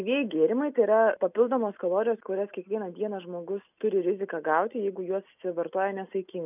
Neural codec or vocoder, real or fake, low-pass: none; real; 3.6 kHz